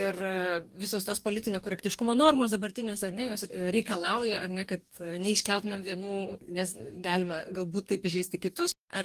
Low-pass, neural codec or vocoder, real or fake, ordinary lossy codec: 14.4 kHz; codec, 44.1 kHz, 2.6 kbps, DAC; fake; Opus, 32 kbps